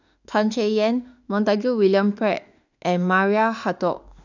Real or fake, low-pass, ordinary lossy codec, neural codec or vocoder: fake; 7.2 kHz; none; autoencoder, 48 kHz, 32 numbers a frame, DAC-VAE, trained on Japanese speech